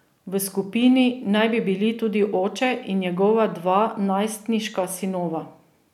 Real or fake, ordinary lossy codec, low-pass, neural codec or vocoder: real; none; 19.8 kHz; none